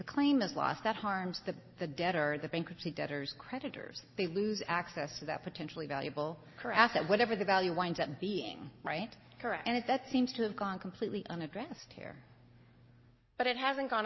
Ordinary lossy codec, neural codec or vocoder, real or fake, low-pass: MP3, 24 kbps; none; real; 7.2 kHz